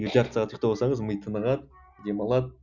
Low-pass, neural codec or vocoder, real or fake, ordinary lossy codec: 7.2 kHz; none; real; none